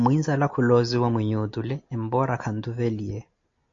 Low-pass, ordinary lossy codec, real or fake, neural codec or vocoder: 7.2 kHz; AAC, 48 kbps; real; none